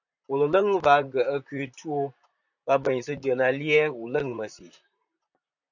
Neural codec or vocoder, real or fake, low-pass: vocoder, 44.1 kHz, 128 mel bands, Pupu-Vocoder; fake; 7.2 kHz